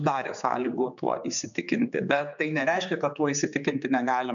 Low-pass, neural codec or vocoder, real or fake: 7.2 kHz; codec, 16 kHz, 4 kbps, X-Codec, HuBERT features, trained on general audio; fake